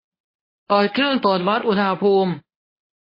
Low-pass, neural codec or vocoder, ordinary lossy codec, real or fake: 5.4 kHz; codec, 24 kHz, 0.9 kbps, WavTokenizer, medium speech release version 1; MP3, 24 kbps; fake